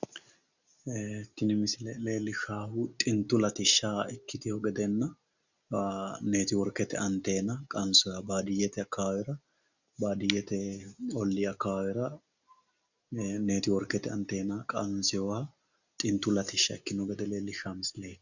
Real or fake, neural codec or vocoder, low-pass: real; none; 7.2 kHz